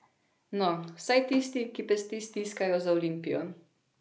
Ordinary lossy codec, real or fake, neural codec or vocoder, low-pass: none; real; none; none